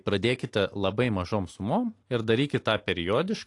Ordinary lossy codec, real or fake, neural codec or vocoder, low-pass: AAC, 48 kbps; real; none; 10.8 kHz